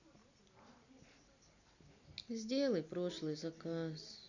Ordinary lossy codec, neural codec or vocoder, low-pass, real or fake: none; none; 7.2 kHz; real